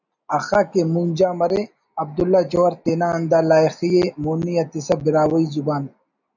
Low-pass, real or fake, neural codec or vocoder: 7.2 kHz; real; none